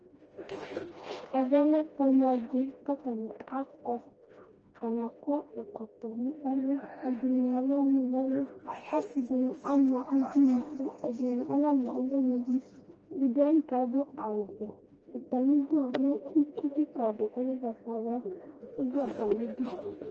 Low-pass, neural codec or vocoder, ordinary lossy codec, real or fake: 7.2 kHz; codec, 16 kHz, 1 kbps, FreqCodec, smaller model; Opus, 24 kbps; fake